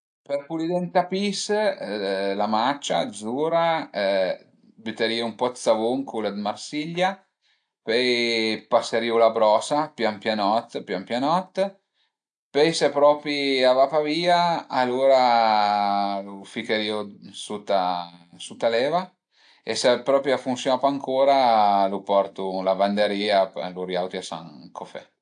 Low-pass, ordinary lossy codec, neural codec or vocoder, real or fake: 9.9 kHz; AAC, 64 kbps; none; real